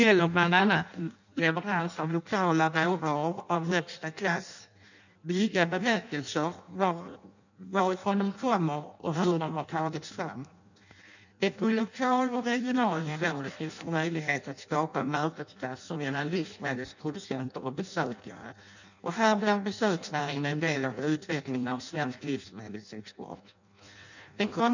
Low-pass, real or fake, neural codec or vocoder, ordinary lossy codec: 7.2 kHz; fake; codec, 16 kHz in and 24 kHz out, 0.6 kbps, FireRedTTS-2 codec; none